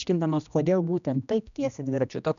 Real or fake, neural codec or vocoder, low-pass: fake; codec, 16 kHz, 1 kbps, X-Codec, HuBERT features, trained on general audio; 7.2 kHz